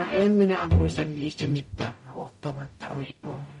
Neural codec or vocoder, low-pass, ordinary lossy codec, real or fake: codec, 44.1 kHz, 0.9 kbps, DAC; 19.8 kHz; MP3, 48 kbps; fake